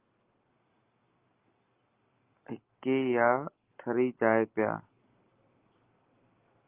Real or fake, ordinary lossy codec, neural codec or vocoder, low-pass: real; Opus, 32 kbps; none; 3.6 kHz